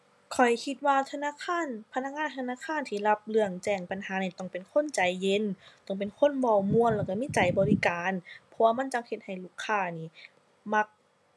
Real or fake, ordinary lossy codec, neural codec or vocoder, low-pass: real; none; none; none